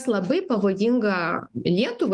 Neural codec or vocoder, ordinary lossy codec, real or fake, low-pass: autoencoder, 48 kHz, 128 numbers a frame, DAC-VAE, trained on Japanese speech; Opus, 24 kbps; fake; 10.8 kHz